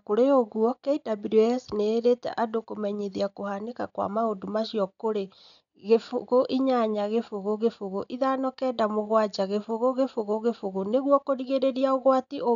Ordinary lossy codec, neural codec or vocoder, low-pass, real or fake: none; none; 7.2 kHz; real